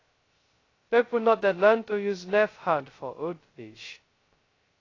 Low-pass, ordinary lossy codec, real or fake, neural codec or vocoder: 7.2 kHz; AAC, 32 kbps; fake; codec, 16 kHz, 0.2 kbps, FocalCodec